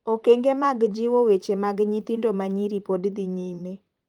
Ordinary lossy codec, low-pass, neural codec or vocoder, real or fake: Opus, 32 kbps; 19.8 kHz; vocoder, 44.1 kHz, 128 mel bands, Pupu-Vocoder; fake